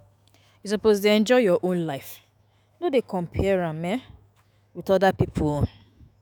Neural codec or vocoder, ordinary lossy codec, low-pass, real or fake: autoencoder, 48 kHz, 128 numbers a frame, DAC-VAE, trained on Japanese speech; none; none; fake